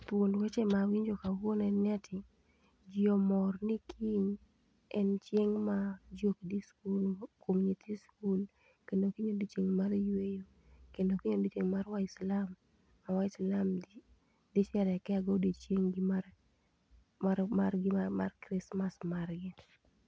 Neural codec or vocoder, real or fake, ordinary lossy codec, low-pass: none; real; none; none